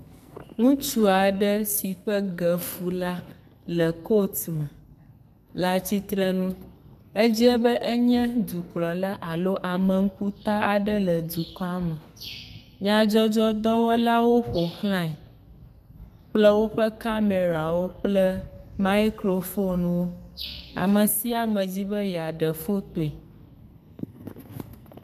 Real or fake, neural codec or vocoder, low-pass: fake; codec, 44.1 kHz, 2.6 kbps, SNAC; 14.4 kHz